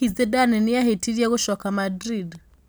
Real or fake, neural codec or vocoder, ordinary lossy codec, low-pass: real; none; none; none